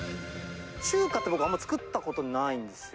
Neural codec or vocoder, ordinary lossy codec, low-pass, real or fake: none; none; none; real